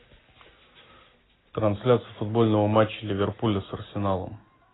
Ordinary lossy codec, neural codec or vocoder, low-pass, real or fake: AAC, 16 kbps; none; 7.2 kHz; real